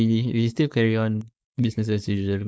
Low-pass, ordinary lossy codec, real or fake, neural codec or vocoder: none; none; fake; codec, 16 kHz, 4.8 kbps, FACodec